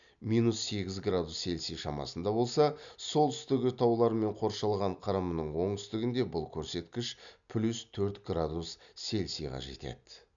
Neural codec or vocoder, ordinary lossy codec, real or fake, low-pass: none; none; real; 7.2 kHz